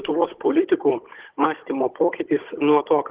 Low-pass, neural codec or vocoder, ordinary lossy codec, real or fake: 3.6 kHz; codec, 16 kHz, 16 kbps, FunCodec, trained on Chinese and English, 50 frames a second; Opus, 16 kbps; fake